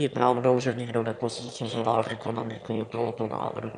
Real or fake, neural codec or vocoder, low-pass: fake; autoencoder, 22.05 kHz, a latent of 192 numbers a frame, VITS, trained on one speaker; 9.9 kHz